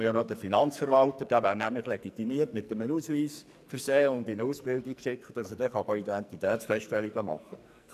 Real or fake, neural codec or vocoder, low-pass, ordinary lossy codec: fake; codec, 44.1 kHz, 2.6 kbps, SNAC; 14.4 kHz; none